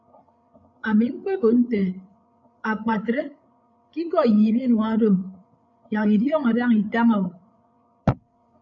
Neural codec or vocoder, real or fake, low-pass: codec, 16 kHz, 8 kbps, FreqCodec, larger model; fake; 7.2 kHz